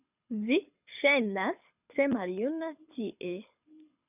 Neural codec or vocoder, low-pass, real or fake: codec, 24 kHz, 6 kbps, HILCodec; 3.6 kHz; fake